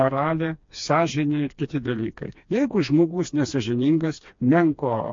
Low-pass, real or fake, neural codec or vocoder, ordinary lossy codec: 7.2 kHz; fake; codec, 16 kHz, 2 kbps, FreqCodec, smaller model; MP3, 48 kbps